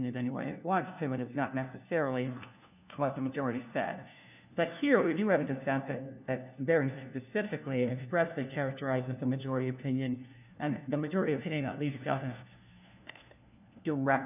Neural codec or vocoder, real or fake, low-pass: codec, 16 kHz, 1 kbps, FunCodec, trained on LibriTTS, 50 frames a second; fake; 3.6 kHz